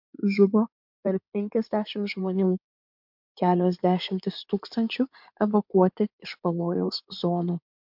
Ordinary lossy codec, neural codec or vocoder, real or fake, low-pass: MP3, 48 kbps; codec, 16 kHz in and 24 kHz out, 2.2 kbps, FireRedTTS-2 codec; fake; 5.4 kHz